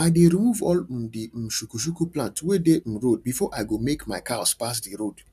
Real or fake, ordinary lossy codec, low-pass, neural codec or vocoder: real; none; 14.4 kHz; none